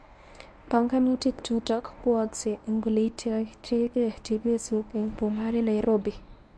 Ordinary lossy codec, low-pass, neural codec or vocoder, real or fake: none; none; codec, 24 kHz, 0.9 kbps, WavTokenizer, medium speech release version 1; fake